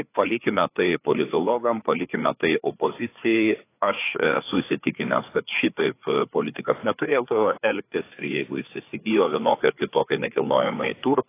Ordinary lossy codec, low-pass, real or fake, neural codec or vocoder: AAC, 24 kbps; 3.6 kHz; fake; codec, 16 kHz, 4 kbps, FreqCodec, larger model